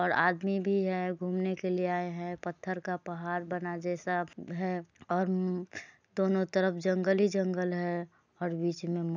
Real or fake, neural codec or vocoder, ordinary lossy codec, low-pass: real; none; none; 7.2 kHz